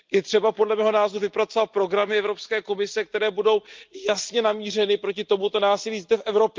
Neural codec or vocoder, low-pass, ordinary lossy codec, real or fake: none; 7.2 kHz; Opus, 24 kbps; real